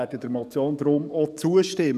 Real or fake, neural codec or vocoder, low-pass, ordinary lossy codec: fake; codec, 44.1 kHz, 7.8 kbps, DAC; 14.4 kHz; none